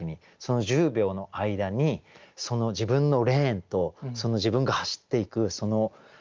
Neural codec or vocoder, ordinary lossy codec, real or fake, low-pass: none; Opus, 32 kbps; real; 7.2 kHz